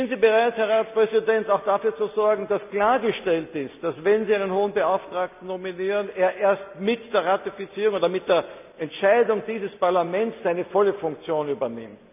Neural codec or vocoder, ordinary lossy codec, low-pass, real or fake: none; none; 3.6 kHz; real